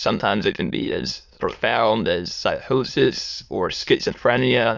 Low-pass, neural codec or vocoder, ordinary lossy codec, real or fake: 7.2 kHz; autoencoder, 22.05 kHz, a latent of 192 numbers a frame, VITS, trained on many speakers; Opus, 64 kbps; fake